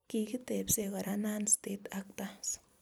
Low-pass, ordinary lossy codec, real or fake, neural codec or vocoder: none; none; fake; vocoder, 44.1 kHz, 128 mel bands every 256 samples, BigVGAN v2